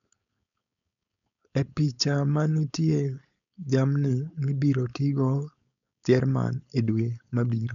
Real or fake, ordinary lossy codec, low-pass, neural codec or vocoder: fake; none; 7.2 kHz; codec, 16 kHz, 4.8 kbps, FACodec